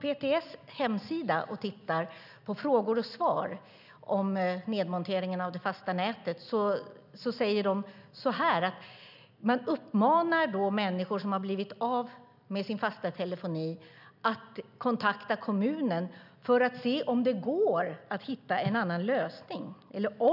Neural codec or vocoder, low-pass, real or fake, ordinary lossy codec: none; 5.4 kHz; real; none